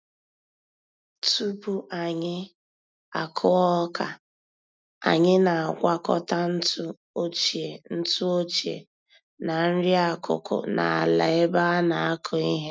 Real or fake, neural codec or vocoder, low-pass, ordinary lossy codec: real; none; none; none